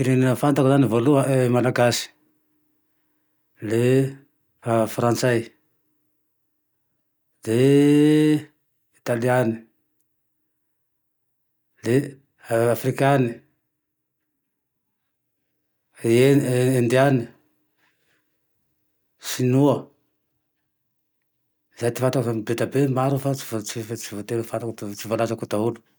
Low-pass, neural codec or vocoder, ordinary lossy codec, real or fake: none; none; none; real